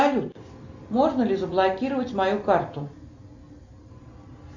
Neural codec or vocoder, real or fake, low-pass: none; real; 7.2 kHz